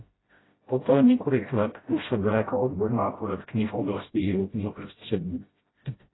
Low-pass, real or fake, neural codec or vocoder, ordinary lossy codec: 7.2 kHz; fake; codec, 16 kHz, 0.5 kbps, FreqCodec, smaller model; AAC, 16 kbps